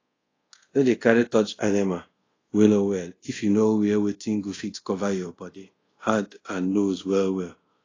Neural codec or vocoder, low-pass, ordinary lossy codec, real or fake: codec, 24 kHz, 0.5 kbps, DualCodec; 7.2 kHz; AAC, 32 kbps; fake